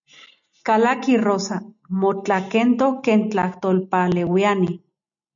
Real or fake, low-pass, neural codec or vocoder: real; 7.2 kHz; none